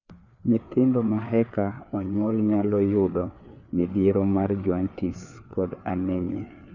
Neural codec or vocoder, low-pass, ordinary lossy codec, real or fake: codec, 16 kHz, 4 kbps, FreqCodec, larger model; 7.2 kHz; none; fake